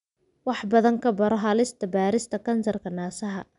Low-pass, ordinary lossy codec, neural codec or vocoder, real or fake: 10.8 kHz; none; none; real